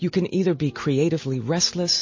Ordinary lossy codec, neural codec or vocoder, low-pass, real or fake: MP3, 32 kbps; none; 7.2 kHz; real